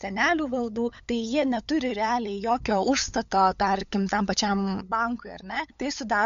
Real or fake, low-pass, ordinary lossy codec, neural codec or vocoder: fake; 7.2 kHz; MP3, 64 kbps; codec, 16 kHz, 8 kbps, FunCodec, trained on LibriTTS, 25 frames a second